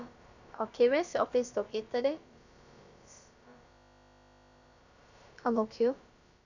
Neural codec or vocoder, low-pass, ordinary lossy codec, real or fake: codec, 16 kHz, about 1 kbps, DyCAST, with the encoder's durations; 7.2 kHz; none; fake